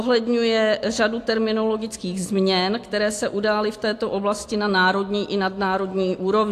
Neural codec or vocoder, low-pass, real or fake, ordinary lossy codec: none; 14.4 kHz; real; AAC, 64 kbps